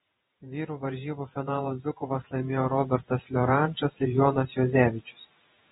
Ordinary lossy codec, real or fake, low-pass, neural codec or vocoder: AAC, 16 kbps; fake; 19.8 kHz; vocoder, 48 kHz, 128 mel bands, Vocos